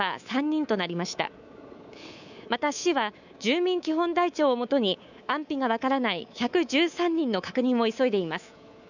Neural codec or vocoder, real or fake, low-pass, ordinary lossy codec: codec, 24 kHz, 3.1 kbps, DualCodec; fake; 7.2 kHz; none